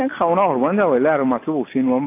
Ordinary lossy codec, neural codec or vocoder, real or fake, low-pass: AAC, 24 kbps; none; real; 3.6 kHz